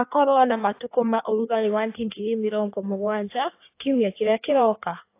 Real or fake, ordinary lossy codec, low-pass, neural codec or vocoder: fake; AAC, 24 kbps; 3.6 kHz; codec, 16 kHz in and 24 kHz out, 1.1 kbps, FireRedTTS-2 codec